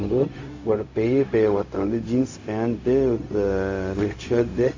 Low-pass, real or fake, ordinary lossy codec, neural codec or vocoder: 7.2 kHz; fake; AAC, 48 kbps; codec, 16 kHz, 0.4 kbps, LongCat-Audio-Codec